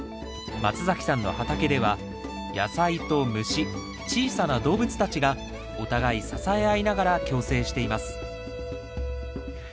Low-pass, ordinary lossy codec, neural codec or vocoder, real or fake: none; none; none; real